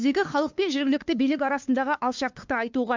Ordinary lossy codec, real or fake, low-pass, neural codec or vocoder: MP3, 64 kbps; fake; 7.2 kHz; codec, 16 kHz, 2 kbps, FunCodec, trained on LibriTTS, 25 frames a second